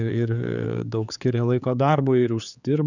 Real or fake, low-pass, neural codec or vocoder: fake; 7.2 kHz; codec, 16 kHz, 4 kbps, X-Codec, HuBERT features, trained on general audio